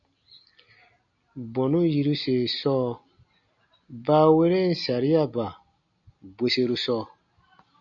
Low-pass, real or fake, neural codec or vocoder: 7.2 kHz; real; none